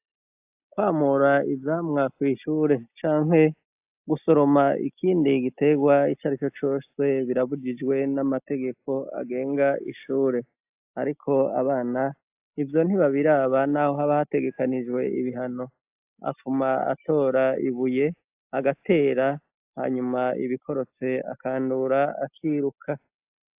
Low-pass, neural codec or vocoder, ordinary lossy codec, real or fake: 3.6 kHz; none; AAC, 32 kbps; real